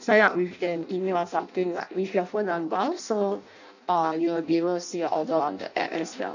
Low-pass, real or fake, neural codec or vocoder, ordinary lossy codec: 7.2 kHz; fake; codec, 16 kHz in and 24 kHz out, 0.6 kbps, FireRedTTS-2 codec; none